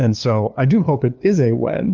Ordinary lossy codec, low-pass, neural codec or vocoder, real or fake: Opus, 32 kbps; 7.2 kHz; codec, 16 kHz, 2 kbps, FunCodec, trained on LibriTTS, 25 frames a second; fake